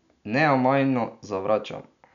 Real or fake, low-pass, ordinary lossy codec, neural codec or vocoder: real; 7.2 kHz; none; none